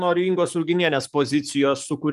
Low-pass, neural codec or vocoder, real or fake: 14.4 kHz; codec, 44.1 kHz, 7.8 kbps, DAC; fake